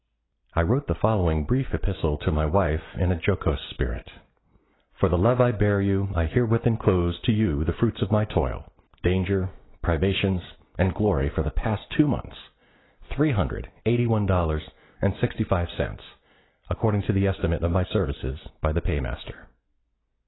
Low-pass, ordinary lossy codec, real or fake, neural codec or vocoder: 7.2 kHz; AAC, 16 kbps; real; none